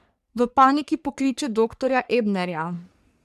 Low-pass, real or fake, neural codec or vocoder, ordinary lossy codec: 14.4 kHz; fake; codec, 44.1 kHz, 3.4 kbps, Pupu-Codec; none